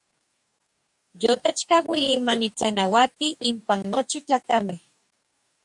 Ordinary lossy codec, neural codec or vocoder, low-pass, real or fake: MP3, 96 kbps; codec, 44.1 kHz, 2.6 kbps, DAC; 10.8 kHz; fake